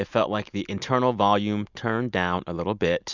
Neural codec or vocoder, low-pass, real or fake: none; 7.2 kHz; real